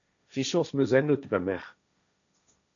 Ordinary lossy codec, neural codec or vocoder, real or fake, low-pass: MP3, 96 kbps; codec, 16 kHz, 1.1 kbps, Voila-Tokenizer; fake; 7.2 kHz